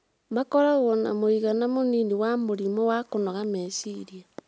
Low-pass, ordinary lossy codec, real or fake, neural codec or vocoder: none; none; real; none